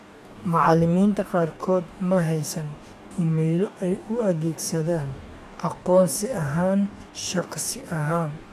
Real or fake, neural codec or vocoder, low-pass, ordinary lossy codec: fake; codec, 44.1 kHz, 2.6 kbps, DAC; 14.4 kHz; none